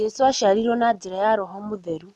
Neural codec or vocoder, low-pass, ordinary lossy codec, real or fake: none; none; none; real